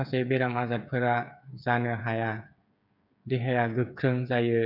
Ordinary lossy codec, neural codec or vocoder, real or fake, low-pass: none; codec, 16 kHz, 8 kbps, FreqCodec, smaller model; fake; 5.4 kHz